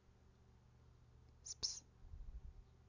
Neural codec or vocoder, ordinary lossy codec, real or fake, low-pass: none; none; real; 7.2 kHz